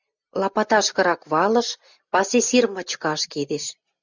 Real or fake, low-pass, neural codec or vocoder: real; 7.2 kHz; none